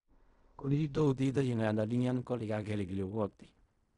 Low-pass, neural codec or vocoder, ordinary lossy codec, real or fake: 10.8 kHz; codec, 16 kHz in and 24 kHz out, 0.4 kbps, LongCat-Audio-Codec, fine tuned four codebook decoder; none; fake